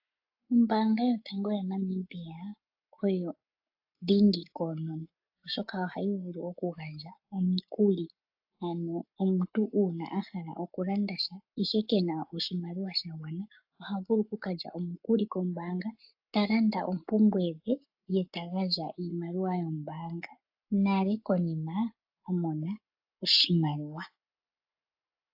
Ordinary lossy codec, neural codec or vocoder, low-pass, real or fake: AAC, 48 kbps; codec, 44.1 kHz, 7.8 kbps, Pupu-Codec; 5.4 kHz; fake